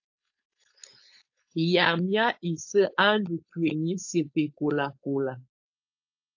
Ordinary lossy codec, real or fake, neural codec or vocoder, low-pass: AAC, 48 kbps; fake; codec, 16 kHz, 4.8 kbps, FACodec; 7.2 kHz